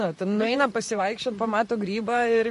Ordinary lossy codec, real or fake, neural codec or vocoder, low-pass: MP3, 48 kbps; fake; vocoder, 44.1 kHz, 128 mel bands, Pupu-Vocoder; 14.4 kHz